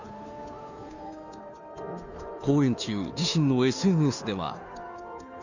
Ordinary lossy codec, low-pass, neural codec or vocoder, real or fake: none; 7.2 kHz; codec, 16 kHz, 2 kbps, FunCodec, trained on Chinese and English, 25 frames a second; fake